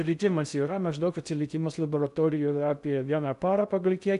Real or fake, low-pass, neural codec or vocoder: fake; 10.8 kHz; codec, 16 kHz in and 24 kHz out, 0.6 kbps, FocalCodec, streaming, 2048 codes